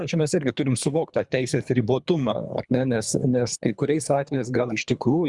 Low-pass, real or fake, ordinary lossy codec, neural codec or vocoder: 10.8 kHz; fake; Opus, 64 kbps; codec, 24 kHz, 3 kbps, HILCodec